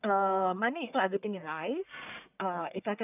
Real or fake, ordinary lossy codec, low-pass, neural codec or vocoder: fake; none; 3.6 kHz; codec, 44.1 kHz, 1.7 kbps, Pupu-Codec